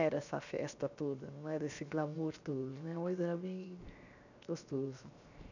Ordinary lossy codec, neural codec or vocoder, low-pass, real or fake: none; codec, 16 kHz, 0.7 kbps, FocalCodec; 7.2 kHz; fake